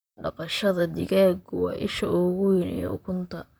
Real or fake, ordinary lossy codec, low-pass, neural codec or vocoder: fake; none; none; vocoder, 44.1 kHz, 128 mel bands, Pupu-Vocoder